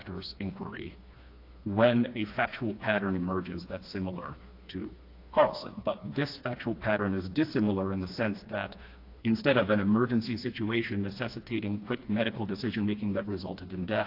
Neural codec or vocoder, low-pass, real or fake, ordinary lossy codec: codec, 16 kHz, 2 kbps, FreqCodec, smaller model; 5.4 kHz; fake; AAC, 32 kbps